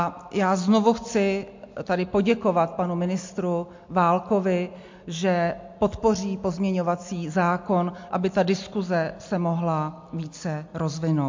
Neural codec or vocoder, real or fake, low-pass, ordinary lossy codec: none; real; 7.2 kHz; MP3, 48 kbps